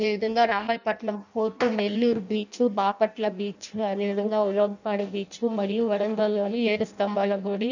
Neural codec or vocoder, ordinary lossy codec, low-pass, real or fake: codec, 16 kHz in and 24 kHz out, 0.6 kbps, FireRedTTS-2 codec; none; 7.2 kHz; fake